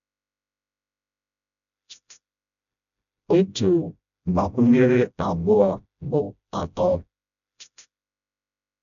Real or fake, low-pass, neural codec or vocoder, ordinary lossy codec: fake; 7.2 kHz; codec, 16 kHz, 0.5 kbps, FreqCodec, smaller model; none